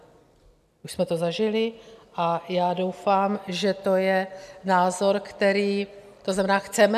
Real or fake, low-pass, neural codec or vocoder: real; 14.4 kHz; none